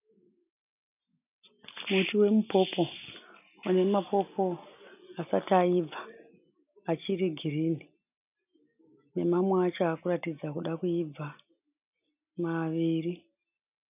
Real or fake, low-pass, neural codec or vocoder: real; 3.6 kHz; none